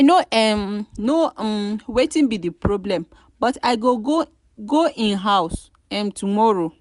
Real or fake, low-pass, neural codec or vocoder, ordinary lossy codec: real; 10.8 kHz; none; none